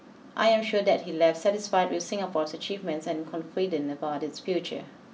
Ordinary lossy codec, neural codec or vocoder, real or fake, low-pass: none; none; real; none